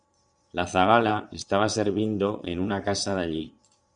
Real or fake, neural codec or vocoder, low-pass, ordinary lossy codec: fake; vocoder, 22.05 kHz, 80 mel bands, Vocos; 9.9 kHz; Opus, 64 kbps